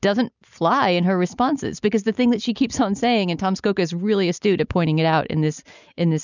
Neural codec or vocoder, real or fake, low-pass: none; real; 7.2 kHz